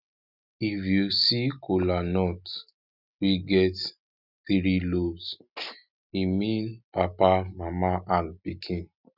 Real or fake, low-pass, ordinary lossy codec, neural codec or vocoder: real; 5.4 kHz; none; none